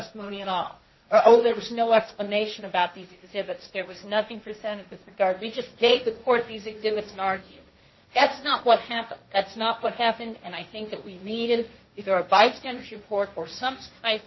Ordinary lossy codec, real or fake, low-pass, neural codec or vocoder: MP3, 24 kbps; fake; 7.2 kHz; codec, 16 kHz, 1.1 kbps, Voila-Tokenizer